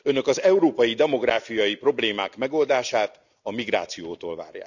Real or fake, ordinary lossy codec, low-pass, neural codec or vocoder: real; none; 7.2 kHz; none